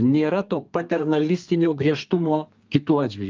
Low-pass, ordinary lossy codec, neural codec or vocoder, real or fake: 7.2 kHz; Opus, 24 kbps; codec, 32 kHz, 1.9 kbps, SNAC; fake